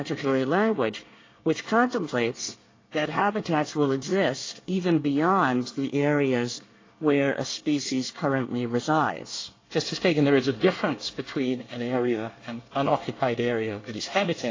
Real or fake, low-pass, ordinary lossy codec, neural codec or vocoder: fake; 7.2 kHz; AAC, 32 kbps; codec, 24 kHz, 1 kbps, SNAC